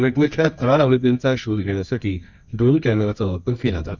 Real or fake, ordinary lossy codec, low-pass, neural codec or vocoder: fake; none; 7.2 kHz; codec, 24 kHz, 0.9 kbps, WavTokenizer, medium music audio release